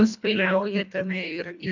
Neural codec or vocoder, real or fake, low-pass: codec, 24 kHz, 1.5 kbps, HILCodec; fake; 7.2 kHz